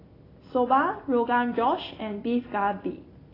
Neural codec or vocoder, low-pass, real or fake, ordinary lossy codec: none; 5.4 kHz; real; AAC, 24 kbps